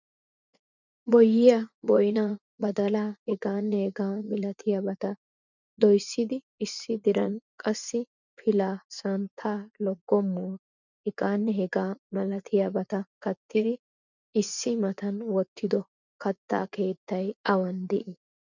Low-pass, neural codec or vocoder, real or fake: 7.2 kHz; vocoder, 24 kHz, 100 mel bands, Vocos; fake